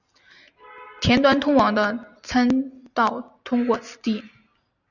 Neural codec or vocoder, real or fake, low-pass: none; real; 7.2 kHz